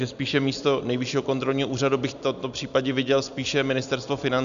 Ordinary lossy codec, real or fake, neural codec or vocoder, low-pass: MP3, 96 kbps; real; none; 7.2 kHz